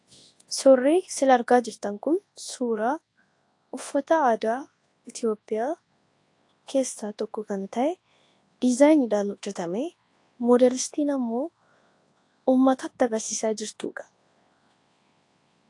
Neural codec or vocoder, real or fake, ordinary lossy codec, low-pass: codec, 24 kHz, 0.9 kbps, WavTokenizer, large speech release; fake; AAC, 48 kbps; 10.8 kHz